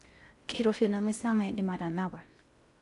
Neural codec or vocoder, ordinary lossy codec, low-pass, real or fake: codec, 16 kHz in and 24 kHz out, 0.6 kbps, FocalCodec, streaming, 4096 codes; none; 10.8 kHz; fake